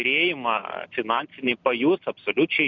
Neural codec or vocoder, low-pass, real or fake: none; 7.2 kHz; real